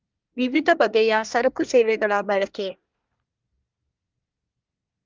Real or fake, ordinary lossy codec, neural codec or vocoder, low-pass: fake; Opus, 32 kbps; codec, 24 kHz, 1 kbps, SNAC; 7.2 kHz